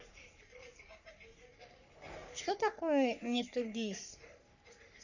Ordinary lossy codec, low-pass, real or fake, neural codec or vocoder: none; 7.2 kHz; fake; codec, 44.1 kHz, 3.4 kbps, Pupu-Codec